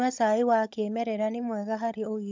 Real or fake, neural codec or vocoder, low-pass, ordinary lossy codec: fake; codec, 16 kHz, 16 kbps, FunCodec, trained on LibriTTS, 50 frames a second; 7.2 kHz; MP3, 64 kbps